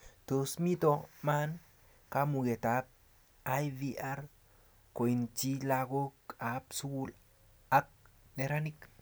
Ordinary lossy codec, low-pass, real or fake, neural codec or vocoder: none; none; real; none